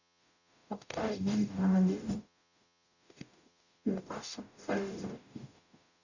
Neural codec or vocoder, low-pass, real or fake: codec, 44.1 kHz, 0.9 kbps, DAC; 7.2 kHz; fake